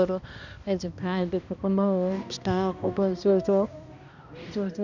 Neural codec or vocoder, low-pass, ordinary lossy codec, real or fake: codec, 16 kHz, 1 kbps, X-Codec, HuBERT features, trained on balanced general audio; 7.2 kHz; none; fake